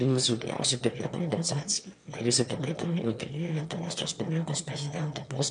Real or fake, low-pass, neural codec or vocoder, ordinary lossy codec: fake; 9.9 kHz; autoencoder, 22.05 kHz, a latent of 192 numbers a frame, VITS, trained on one speaker; MP3, 64 kbps